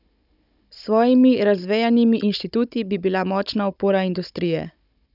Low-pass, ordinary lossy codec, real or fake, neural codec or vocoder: 5.4 kHz; none; fake; codec, 16 kHz, 16 kbps, FunCodec, trained on Chinese and English, 50 frames a second